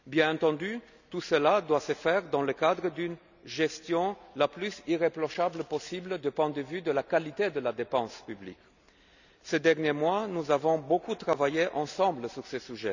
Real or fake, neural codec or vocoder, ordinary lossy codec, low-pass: real; none; none; 7.2 kHz